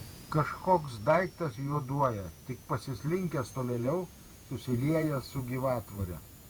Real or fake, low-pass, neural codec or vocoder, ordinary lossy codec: fake; 19.8 kHz; vocoder, 48 kHz, 128 mel bands, Vocos; Opus, 64 kbps